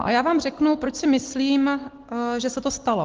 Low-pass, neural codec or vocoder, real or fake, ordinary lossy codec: 7.2 kHz; none; real; Opus, 16 kbps